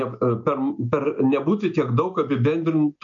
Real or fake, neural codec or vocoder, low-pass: real; none; 7.2 kHz